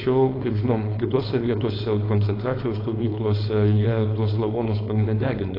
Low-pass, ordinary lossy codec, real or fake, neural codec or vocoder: 5.4 kHz; AAC, 24 kbps; fake; codec, 16 kHz, 4.8 kbps, FACodec